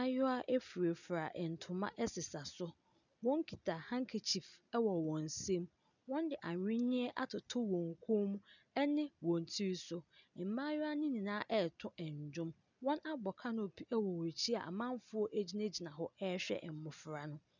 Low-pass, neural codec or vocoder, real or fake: 7.2 kHz; none; real